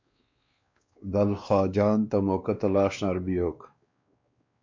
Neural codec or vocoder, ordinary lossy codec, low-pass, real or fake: codec, 16 kHz, 2 kbps, X-Codec, WavLM features, trained on Multilingual LibriSpeech; MP3, 48 kbps; 7.2 kHz; fake